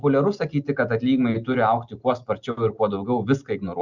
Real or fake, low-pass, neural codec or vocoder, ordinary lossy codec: real; 7.2 kHz; none; Opus, 64 kbps